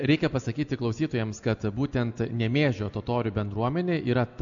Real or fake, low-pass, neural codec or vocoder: real; 7.2 kHz; none